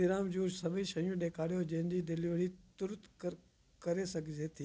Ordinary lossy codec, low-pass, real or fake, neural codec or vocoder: none; none; real; none